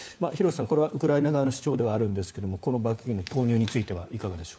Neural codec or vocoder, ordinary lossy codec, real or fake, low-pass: codec, 16 kHz, 4 kbps, FunCodec, trained on LibriTTS, 50 frames a second; none; fake; none